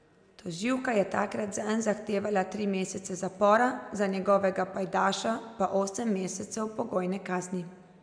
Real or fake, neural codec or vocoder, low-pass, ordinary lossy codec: real; none; 9.9 kHz; none